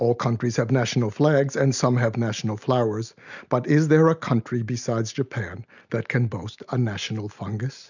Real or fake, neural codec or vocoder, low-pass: real; none; 7.2 kHz